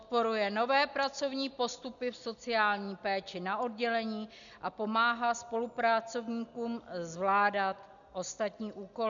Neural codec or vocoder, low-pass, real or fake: none; 7.2 kHz; real